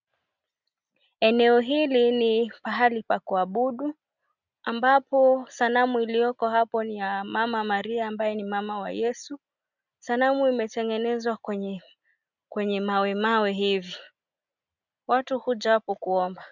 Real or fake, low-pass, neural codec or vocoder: real; 7.2 kHz; none